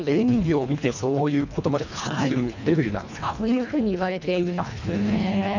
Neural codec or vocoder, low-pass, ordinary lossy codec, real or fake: codec, 24 kHz, 1.5 kbps, HILCodec; 7.2 kHz; none; fake